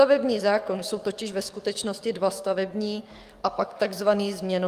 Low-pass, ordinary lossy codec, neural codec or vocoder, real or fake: 14.4 kHz; Opus, 32 kbps; codec, 44.1 kHz, 7.8 kbps, DAC; fake